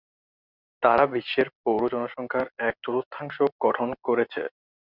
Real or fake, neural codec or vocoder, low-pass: real; none; 5.4 kHz